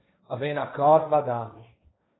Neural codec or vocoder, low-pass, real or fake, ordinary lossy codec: codec, 16 kHz, 1.1 kbps, Voila-Tokenizer; 7.2 kHz; fake; AAC, 16 kbps